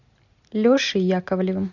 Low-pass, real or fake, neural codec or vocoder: 7.2 kHz; real; none